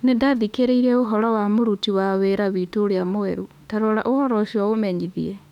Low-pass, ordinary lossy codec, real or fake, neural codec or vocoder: 19.8 kHz; none; fake; autoencoder, 48 kHz, 32 numbers a frame, DAC-VAE, trained on Japanese speech